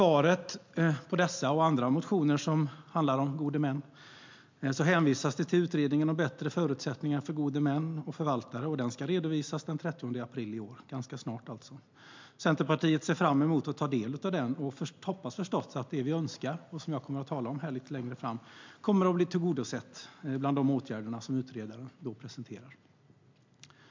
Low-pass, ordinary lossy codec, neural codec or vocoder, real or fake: 7.2 kHz; MP3, 64 kbps; none; real